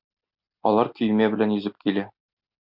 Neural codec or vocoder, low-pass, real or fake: none; 5.4 kHz; real